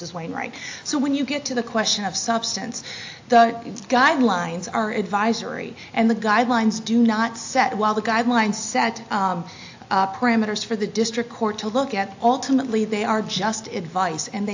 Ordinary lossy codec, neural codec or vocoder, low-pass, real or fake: AAC, 48 kbps; none; 7.2 kHz; real